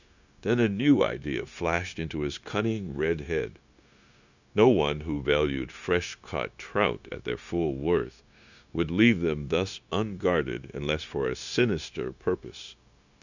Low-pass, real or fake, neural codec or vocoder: 7.2 kHz; fake; codec, 16 kHz, 0.9 kbps, LongCat-Audio-Codec